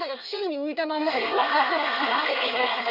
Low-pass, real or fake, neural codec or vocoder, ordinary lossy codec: 5.4 kHz; fake; codec, 24 kHz, 1 kbps, SNAC; none